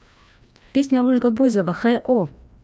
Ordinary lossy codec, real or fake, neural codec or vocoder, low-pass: none; fake; codec, 16 kHz, 1 kbps, FreqCodec, larger model; none